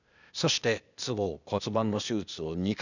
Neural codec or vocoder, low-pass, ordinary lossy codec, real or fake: codec, 16 kHz, 0.8 kbps, ZipCodec; 7.2 kHz; none; fake